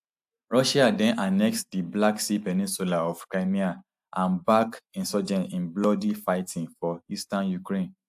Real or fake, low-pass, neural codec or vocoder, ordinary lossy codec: real; 14.4 kHz; none; AAC, 96 kbps